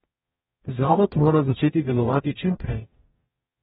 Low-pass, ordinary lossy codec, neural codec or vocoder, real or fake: 19.8 kHz; AAC, 16 kbps; codec, 44.1 kHz, 0.9 kbps, DAC; fake